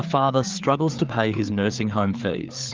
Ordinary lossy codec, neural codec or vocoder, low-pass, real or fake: Opus, 32 kbps; codec, 16 kHz, 4 kbps, FreqCodec, larger model; 7.2 kHz; fake